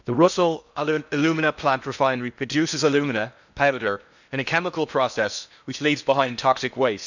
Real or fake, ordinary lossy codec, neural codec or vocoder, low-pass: fake; none; codec, 16 kHz in and 24 kHz out, 0.8 kbps, FocalCodec, streaming, 65536 codes; 7.2 kHz